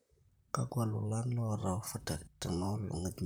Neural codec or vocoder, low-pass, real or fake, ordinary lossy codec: vocoder, 44.1 kHz, 128 mel bands, Pupu-Vocoder; none; fake; none